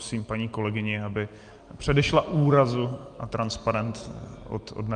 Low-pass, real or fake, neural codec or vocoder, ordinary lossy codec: 9.9 kHz; real; none; Opus, 32 kbps